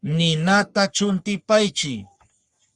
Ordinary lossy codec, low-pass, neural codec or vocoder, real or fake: Opus, 64 kbps; 10.8 kHz; codec, 44.1 kHz, 3.4 kbps, Pupu-Codec; fake